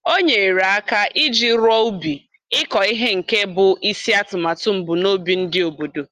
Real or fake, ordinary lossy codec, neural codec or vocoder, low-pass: real; Opus, 24 kbps; none; 14.4 kHz